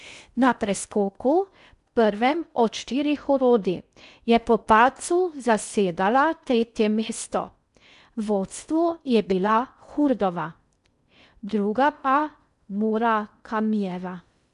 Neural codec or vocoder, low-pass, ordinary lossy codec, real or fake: codec, 16 kHz in and 24 kHz out, 0.6 kbps, FocalCodec, streaming, 4096 codes; 10.8 kHz; none; fake